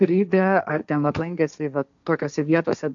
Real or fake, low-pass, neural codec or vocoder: fake; 7.2 kHz; codec, 16 kHz, 1.1 kbps, Voila-Tokenizer